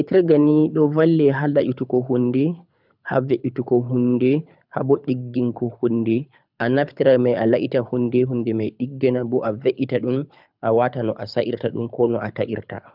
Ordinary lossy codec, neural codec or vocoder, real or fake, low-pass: none; codec, 24 kHz, 6 kbps, HILCodec; fake; 5.4 kHz